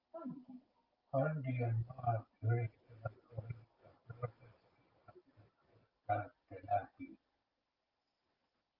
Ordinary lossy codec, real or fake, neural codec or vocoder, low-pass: Opus, 32 kbps; real; none; 5.4 kHz